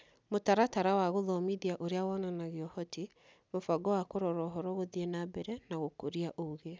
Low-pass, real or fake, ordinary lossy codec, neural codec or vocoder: none; real; none; none